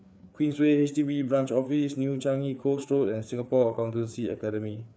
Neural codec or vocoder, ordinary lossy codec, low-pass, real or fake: codec, 16 kHz, 4 kbps, FreqCodec, larger model; none; none; fake